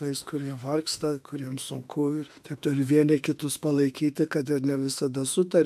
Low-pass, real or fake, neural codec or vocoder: 14.4 kHz; fake; autoencoder, 48 kHz, 32 numbers a frame, DAC-VAE, trained on Japanese speech